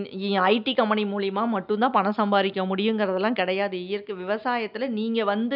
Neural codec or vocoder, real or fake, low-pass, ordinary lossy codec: none; real; 5.4 kHz; none